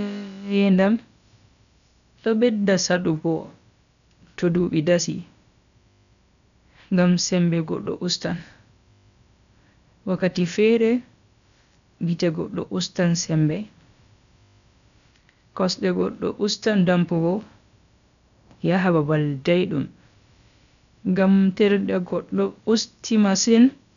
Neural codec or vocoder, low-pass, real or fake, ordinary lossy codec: codec, 16 kHz, about 1 kbps, DyCAST, with the encoder's durations; 7.2 kHz; fake; none